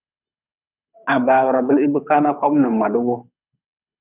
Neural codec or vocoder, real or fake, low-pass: codec, 24 kHz, 6 kbps, HILCodec; fake; 3.6 kHz